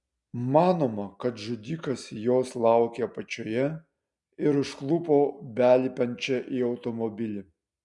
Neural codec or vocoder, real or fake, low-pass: none; real; 10.8 kHz